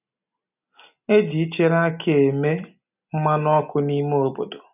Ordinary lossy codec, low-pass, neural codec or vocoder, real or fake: none; 3.6 kHz; none; real